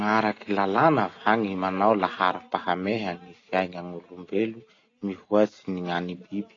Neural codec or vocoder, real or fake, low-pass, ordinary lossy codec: none; real; 7.2 kHz; AAC, 32 kbps